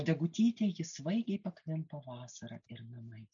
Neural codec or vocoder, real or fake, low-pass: none; real; 7.2 kHz